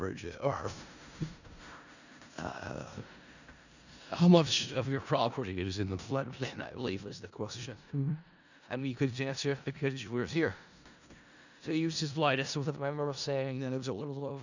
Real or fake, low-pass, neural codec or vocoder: fake; 7.2 kHz; codec, 16 kHz in and 24 kHz out, 0.4 kbps, LongCat-Audio-Codec, four codebook decoder